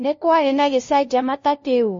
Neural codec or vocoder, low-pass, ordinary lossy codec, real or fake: codec, 16 kHz, 0.5 kbps, FunCodec, trained on LibriTTS, 25 frames a second; 7.2 kHz; MP3, 32 kbps; fake